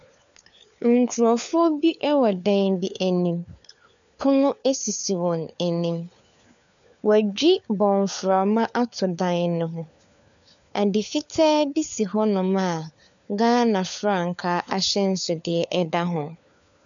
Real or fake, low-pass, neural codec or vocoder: fake; 7.2 kHz; codec, 16 kHz, 4 kbps, FunCodec, trained on LibriTTS, 50 frames a second